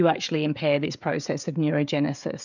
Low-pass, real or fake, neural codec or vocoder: 7.2 kHz; fake; codec, 16 kHz, 16 kbps, FreqCodec, smaller model